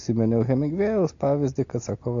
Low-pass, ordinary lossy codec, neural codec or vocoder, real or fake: 7.2 kHz; AAC, 48 kbps; none; real